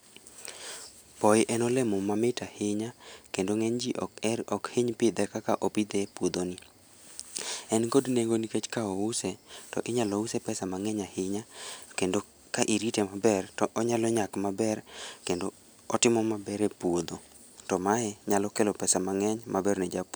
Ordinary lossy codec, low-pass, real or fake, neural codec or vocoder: none; none; real; none